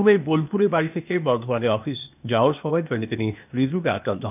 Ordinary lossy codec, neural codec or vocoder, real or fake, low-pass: none; codec, 16 kHz, 0.8 kbps, ZipCodec; fake; 3.6 kHz